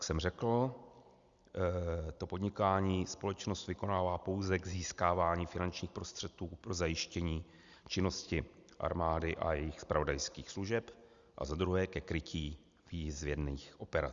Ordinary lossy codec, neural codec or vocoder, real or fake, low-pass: Opus, 64 kbps; none; real; 7.2 kHz